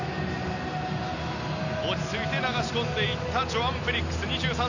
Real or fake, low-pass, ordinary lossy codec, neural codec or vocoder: real; 7.2 kHz; none; none